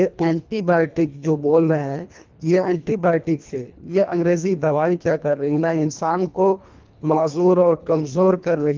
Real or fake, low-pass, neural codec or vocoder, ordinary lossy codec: fake; 7.2 kHz; codec, 24 kHz, 1.5 kbps, HILCodec; Opus, 24 kbps